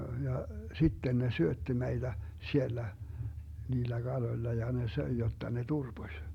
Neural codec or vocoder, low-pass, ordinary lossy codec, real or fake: none; 19.8 kHz; MP3, 96 kbps; real